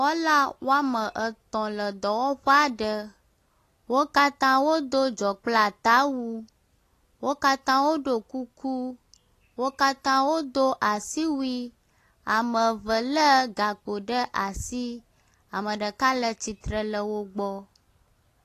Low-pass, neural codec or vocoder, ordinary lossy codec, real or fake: 14.4 kHz; none; AAC, 48 kbps; real